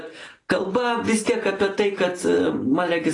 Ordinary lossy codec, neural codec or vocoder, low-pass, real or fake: AAC, 32 kbps; none; 10.8 kHz; real